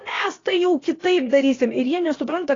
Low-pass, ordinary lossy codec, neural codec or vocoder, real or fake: 7.2 kHz; AAC, 32 kbps; codec, 16 kHz, about 1 kbps, DyCAST, with the encoder's durations; fake